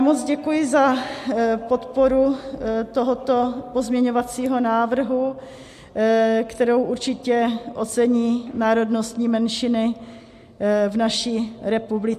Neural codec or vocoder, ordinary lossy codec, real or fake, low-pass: none; MP3, 64 kbps; real; 14.4 kHz